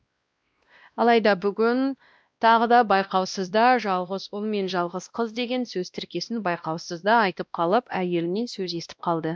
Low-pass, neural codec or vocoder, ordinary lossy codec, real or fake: none; codec, 16 kHz, 1 kbps, X-Codec, WavLM features, trained on Multilingual LibriSpeech; none; fake